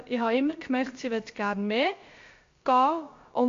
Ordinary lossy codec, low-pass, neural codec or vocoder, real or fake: MP3, 48 kbps; 7.2 kHz; codec, 16 kHz, about 1 kbps, DyCAST, with the encoder's durations; fake